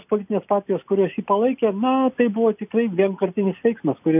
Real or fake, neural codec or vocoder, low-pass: real; none; 3.6 kHz